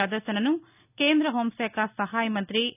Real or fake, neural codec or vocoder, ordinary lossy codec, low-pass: real; none; none; 3.6 kHz